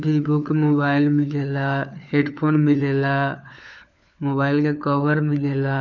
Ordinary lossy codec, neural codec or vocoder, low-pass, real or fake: none; codec, 16 kHz, 4 kbps, FunCodec, trained on LibriTTS, 50 frames a second; 7.2 kHz; fake